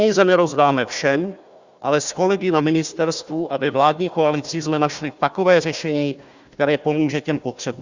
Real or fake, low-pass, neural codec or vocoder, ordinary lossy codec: fake; 7.2 kHz; codec, 16 kHz, 1 kbps, FunCodec, trained on Chinese and English, 50 frames a second; Opus, 64 kbps